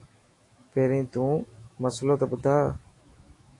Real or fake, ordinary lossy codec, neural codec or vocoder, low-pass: fake; AAC, 48 kbps; autoencoder, 48 kHz, 128 numbers a frame, DAC-VAE, trained on Japanese speech; 10.8 kHz